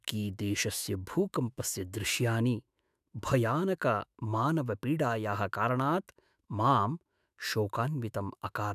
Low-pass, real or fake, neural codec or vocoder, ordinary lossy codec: 14.4 kHz; fake; autoencoder, 48 kHz, 128 numbers a frame, DAC-VAE, trained on Japanese speech; none